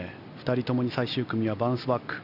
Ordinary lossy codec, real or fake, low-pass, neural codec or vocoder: none; real; 5.4 kHz; none